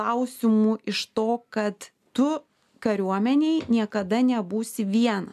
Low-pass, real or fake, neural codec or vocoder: 14.4 kHz; real; none